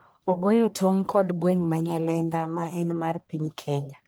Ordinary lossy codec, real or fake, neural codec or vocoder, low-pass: none; fake; codec, 44.1 kHz, 1.7 kbps, Pupu-Codec; none